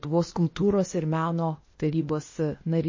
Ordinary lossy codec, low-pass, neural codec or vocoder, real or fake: MP3, 32 kbps; 7.2 kHz; codec, 16 kHz, 0.8 kbps, ZipCodec; fake